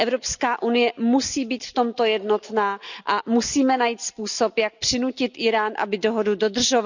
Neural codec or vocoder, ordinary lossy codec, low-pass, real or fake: none; none; 7.2 kHz; real